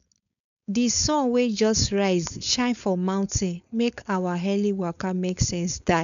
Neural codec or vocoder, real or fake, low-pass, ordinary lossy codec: codec, 16 kHz, 4.8 kbps, FACodec; fake; 7.2 kHz; none